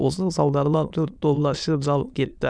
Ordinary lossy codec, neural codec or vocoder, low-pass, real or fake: none; autoencoder, 22.05 kHz, a latent of 192 numbers a frame, VITS, trained on many speakers; 9.9 kHz; fake